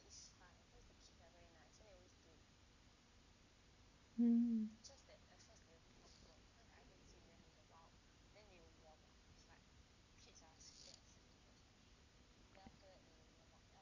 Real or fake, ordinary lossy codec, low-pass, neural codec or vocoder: real; Opus, 64 kbps; 7.2 kHz; none